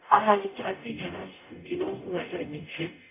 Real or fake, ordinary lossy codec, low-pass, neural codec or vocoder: fake; none; 3.6 kHz; codec, 44.1 kHz, 0.9 kbps, DAC